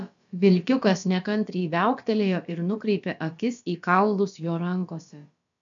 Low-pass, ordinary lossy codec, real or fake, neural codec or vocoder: 7.2 kHz; MP3, 96 kbps; fake; codec, 16 kHz, about 1 kbps, DyCAST, with the encoder's durations